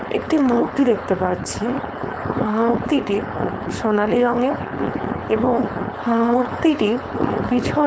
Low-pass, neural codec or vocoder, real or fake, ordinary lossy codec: none; codec, 16 kHz, 4.8 kbps, FACodec; fake; none